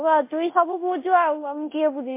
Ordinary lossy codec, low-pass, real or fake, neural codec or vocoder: MP3, 24 kbps; 3.6 kHz; fake; codec, 24 kHz, 0.9 kbps, DualCodec